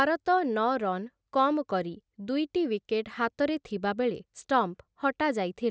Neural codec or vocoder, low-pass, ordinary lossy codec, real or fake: none; none; none; real